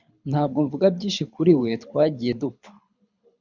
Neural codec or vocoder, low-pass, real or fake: codec, 24 kHz, 6 kbps, HILCodec; 7.2 kHz; fake